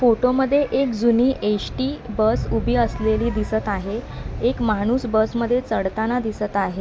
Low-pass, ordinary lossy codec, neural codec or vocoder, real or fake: none; none; none; real